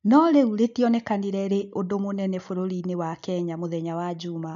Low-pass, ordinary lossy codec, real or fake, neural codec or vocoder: 7.2 kHz; none; real; none